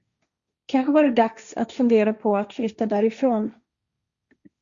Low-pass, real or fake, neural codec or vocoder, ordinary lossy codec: 7.2 kHz; fake; codec, 16 kHz, 1.1 kbps, Voila-Tokenizer; Opus, 64 kbps